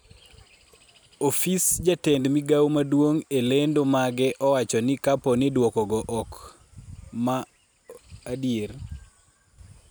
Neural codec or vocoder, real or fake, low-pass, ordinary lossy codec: none; real; none; none